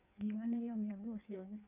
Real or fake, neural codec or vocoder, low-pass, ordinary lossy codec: fake; codec, 16 kHz in and 24 kHz out, 1.1 kbps, FireRedTTS-2 codec; 3.6 kHz; none